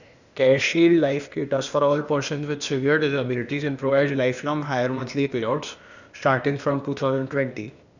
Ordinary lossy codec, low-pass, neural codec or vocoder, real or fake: none; 7.2 kHz; codec, 16 kHz, 0.8 kbps, ZipCodec; fake